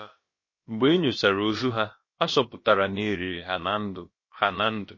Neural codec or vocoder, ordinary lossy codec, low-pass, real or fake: codec, 16 kHz, about 1 kbps, DyCAST, with the encoder's durations; MP3, 32 kbps; 7.2 kHz; fake